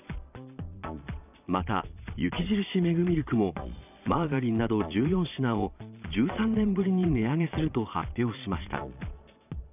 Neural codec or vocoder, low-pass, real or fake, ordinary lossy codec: vocoder, 22.05 kHz, 80 mel bands, Vocos; 3.6 kHz; fake; none